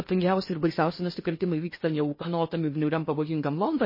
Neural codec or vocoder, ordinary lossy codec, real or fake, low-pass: codec, 16 kHz in and 24 kHz out, 0.8 kbps, FocalCodec, streaming, 65536 codes; MP3, 24 kbps; fake; 5.4 kHz